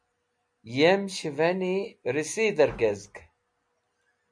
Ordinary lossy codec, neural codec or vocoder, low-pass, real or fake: MP3, 64 kbps; none; 9.9 kHz; real